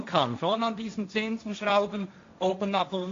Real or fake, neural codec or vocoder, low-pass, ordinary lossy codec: fake; codec, 16 kHz, 1.1 kbps, Voila-Tokenizer; 7.2 kHz; AAC, 48 kbps